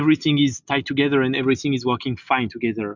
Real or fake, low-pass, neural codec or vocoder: real; 7.2 kHz; none